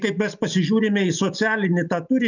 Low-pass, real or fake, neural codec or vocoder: 7.2 kHz; real; none